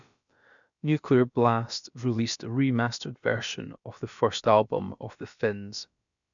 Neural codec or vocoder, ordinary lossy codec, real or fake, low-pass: codec, 16 kHz, about 1 kbps, DyCAST, with the encoder's durations; none; fake; 7.2 kHz